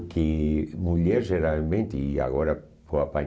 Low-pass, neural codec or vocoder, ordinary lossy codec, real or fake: none; none; none; real